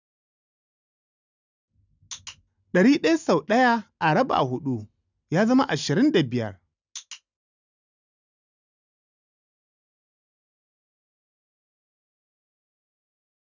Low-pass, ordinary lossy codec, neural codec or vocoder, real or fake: 7.2 kHz; none; none; real